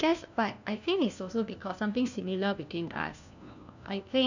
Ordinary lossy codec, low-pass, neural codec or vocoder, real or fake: none; 7.2 kHz; codec, 16 kHz, 1 kbps, FunCodec, trained on LibriTTS, 50 frames a second; fake